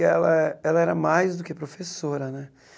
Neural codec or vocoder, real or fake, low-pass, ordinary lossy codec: none; real; none; none